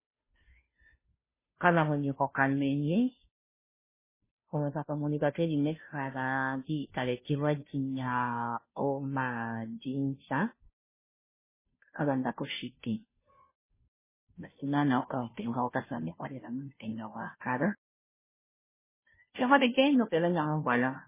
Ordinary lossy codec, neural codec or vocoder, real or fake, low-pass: MP3, 16 kbps; codec, 16 kHz, 0.5 kbps, FunCodec, trained on Chinese and English, 25 frames a second; fake; 3.6 kHz